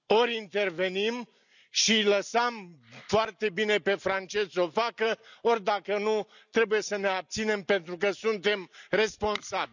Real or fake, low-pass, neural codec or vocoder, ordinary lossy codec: real; 7.2 kHz; none; none